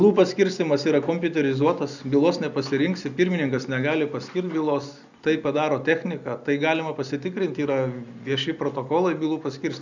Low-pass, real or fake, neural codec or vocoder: 7.2 kHz; real; none